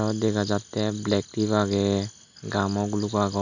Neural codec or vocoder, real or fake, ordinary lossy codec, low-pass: none; real; none; 7.2 kHz